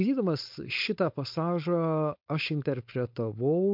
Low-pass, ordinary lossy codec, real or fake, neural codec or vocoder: 5.4 kHz; MP3, 48 kbps; fake; codec, 16 kHz, 4.8 kbps, FACodec